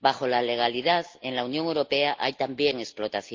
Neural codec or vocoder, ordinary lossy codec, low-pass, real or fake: none; Opus, 32 kbps; 7.2 kHz; real